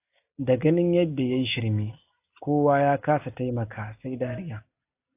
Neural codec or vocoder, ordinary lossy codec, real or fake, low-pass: none; AAC, 24 kbps; real; 3.6 kHz